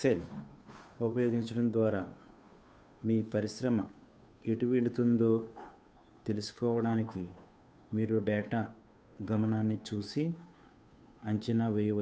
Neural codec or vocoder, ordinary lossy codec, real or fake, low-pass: codec, 16 kHz, 2 kbps, FunCodec, trained on Chinese and English, 25 frames a second; none; fake; none